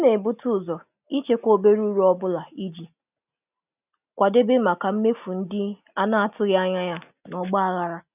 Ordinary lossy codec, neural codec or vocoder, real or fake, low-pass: none; none; real; 3.6 kHz